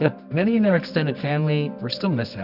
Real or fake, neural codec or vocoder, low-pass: fake; codec, 24 kHz, 0.9 kbps, WavTokenizer, medium music audio release; 5.4 kHz